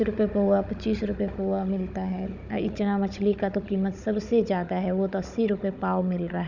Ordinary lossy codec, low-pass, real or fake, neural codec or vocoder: none; 7.2 kHz; fake; codec, 16 kHz, 16 kbps, FunCodec, trained on Chinese and English, 50 frames a second